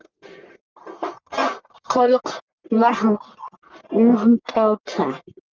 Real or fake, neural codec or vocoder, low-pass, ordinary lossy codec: fake; codec, 44.1 kHz, 1.7 kbps, Pupu-Codec; 7.2 kHz; Opus, 32 kbps